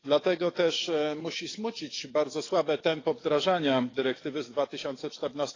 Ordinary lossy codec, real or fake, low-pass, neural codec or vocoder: AAC, 32 kbps; fake; 7.2 kHz; codec, 44.1 kHz, 7.8 kbps, DAC